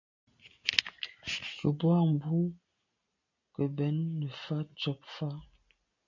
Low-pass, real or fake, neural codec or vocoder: 7.2 kHz; real; none